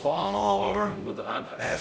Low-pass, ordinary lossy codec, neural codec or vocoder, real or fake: none; none; codec, 16 kHz, 0.5 kbps, X-Codec, HuBERT features, trained on LibriSpeech; fake